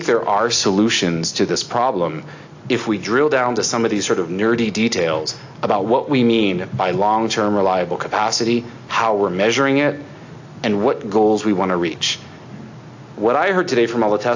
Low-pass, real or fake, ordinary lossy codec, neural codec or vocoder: 7.2 kHz; real; AAC, 48 kbps; none